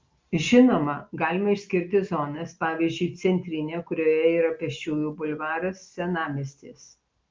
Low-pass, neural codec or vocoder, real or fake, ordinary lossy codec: 7.2 kHz; none; real; Opus, 32 kbps